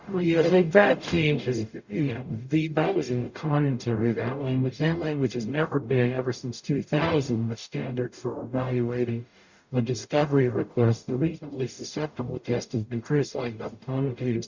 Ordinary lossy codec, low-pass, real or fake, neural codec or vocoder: Opus, 64 kbps; 7.2 kHz; fake; codec, 44.1 kHz, 0.9 kbps, DAC